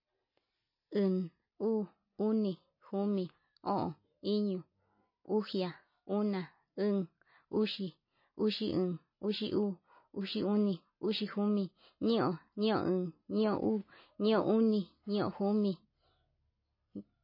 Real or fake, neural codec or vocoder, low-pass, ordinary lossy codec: real; none; 5.4 kHz; MP3, 24 kbps